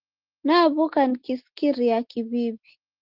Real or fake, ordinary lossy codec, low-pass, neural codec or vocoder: real; Opus, 16 kbps; 5.4 kHz; none